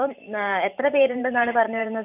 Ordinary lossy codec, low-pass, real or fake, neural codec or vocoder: none; 3.6 kHz; fake; vocoder, 44.1 kHz, 128 mel bands every 512 samples, BigVGAN v2